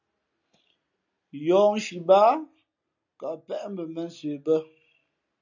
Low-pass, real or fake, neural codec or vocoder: 7.2 kHz; real; none